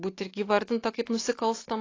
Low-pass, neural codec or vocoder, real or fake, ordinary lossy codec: 7.2 kHz; none; real; AAC, 32 kbps